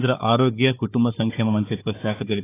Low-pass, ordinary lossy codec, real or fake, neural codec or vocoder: 3.6 kHz; AAC, 16 kbps; fake; codec, 16 kHz, 4 kbps, FunCodec, trained on Chinese and English, 50 frames a second